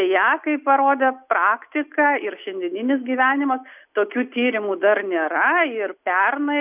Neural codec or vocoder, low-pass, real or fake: none; 3.6 kHz; real